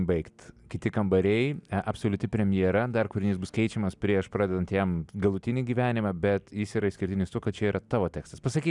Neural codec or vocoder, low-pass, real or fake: none; 10.8 kHz; real